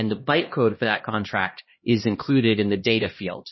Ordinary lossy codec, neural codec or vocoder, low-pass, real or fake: MP3, 24 kbps; codec, 16 kHz, 1 kbps, X-Codec, HuBERT features, trained on LibriSpeech; 7.2 kHz; fake